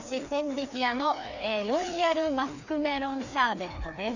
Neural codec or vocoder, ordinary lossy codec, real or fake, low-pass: codec, 16 kHz, 2 kbps, FreqCodec, larger model; none; fake; 7.2 kHz